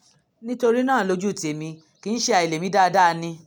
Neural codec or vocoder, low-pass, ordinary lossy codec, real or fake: none; none; none; real